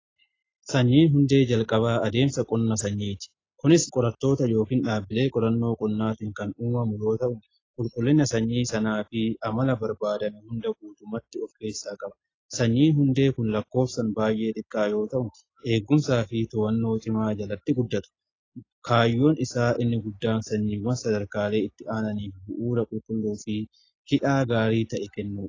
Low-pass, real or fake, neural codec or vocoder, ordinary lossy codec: 7.2 kHz; real; none; AAC, 32 kbps